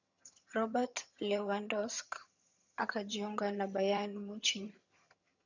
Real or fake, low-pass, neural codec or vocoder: fake; 7.2 kHz; vocoder, 22.05 kHz, 80 mel bands, HiFi-GAN